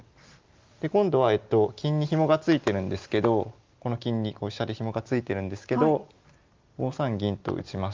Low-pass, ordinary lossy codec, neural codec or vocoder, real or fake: 7.2 kHz; Opus, 24 kbps; none; real